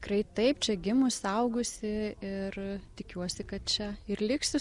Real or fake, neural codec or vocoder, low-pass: real; none; 10.8 kHz